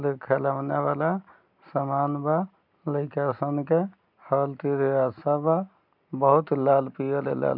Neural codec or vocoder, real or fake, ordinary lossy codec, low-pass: none; real; none; 5.4 kHz